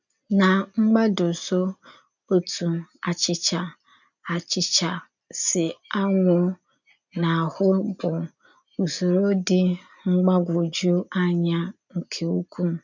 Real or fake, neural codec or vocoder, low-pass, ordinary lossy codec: real; none; 7.2 kHz; none